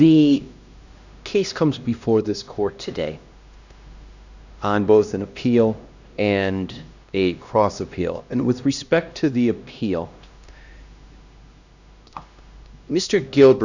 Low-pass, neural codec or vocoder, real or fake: 7.2 kHz; codec, 16 kHz, 1 kbps, X-Codec, HuBERT features, trained on LibriSpeech; fake